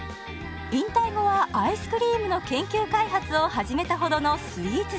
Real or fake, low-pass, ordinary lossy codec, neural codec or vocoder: real; none; none; none